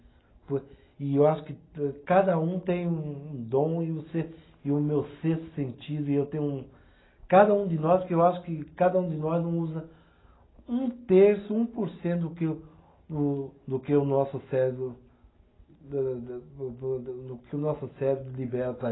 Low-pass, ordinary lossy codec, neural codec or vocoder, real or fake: 7.2 kHz; AAC, 16 kbps; none; real